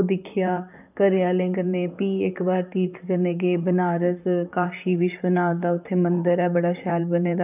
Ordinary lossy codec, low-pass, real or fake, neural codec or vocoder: none; 3.6 kHz; fake; vocoder, 44.1 kHz, 128 mel bands, Pupu-Vocoder